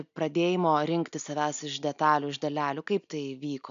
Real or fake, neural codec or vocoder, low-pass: real; none; 7.2 kHz